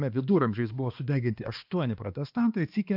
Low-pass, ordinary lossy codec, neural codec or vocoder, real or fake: 5.4 kHz; AAC, 48 kbps; codec, 16 kHz, 2 kbps, X-Codec, HuBERT features, trained on balanced general audio; fake